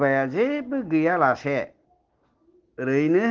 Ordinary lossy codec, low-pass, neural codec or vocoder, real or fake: Opus, 16 kbps; 7.2 kHz; none; real